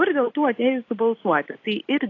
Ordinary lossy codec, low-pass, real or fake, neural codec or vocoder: AAC, 32 kbps; 7.2 kHz; real; none